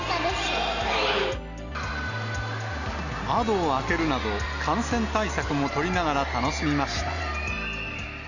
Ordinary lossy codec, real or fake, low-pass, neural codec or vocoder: none; real; 7.2 kHz; none